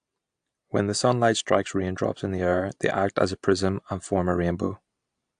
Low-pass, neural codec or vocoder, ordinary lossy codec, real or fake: 9.9 kHz; none; AAC, 64 kbps; real